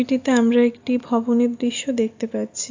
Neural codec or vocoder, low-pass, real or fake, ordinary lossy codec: none; 7.2 kHz; real; AAC, 48 kbps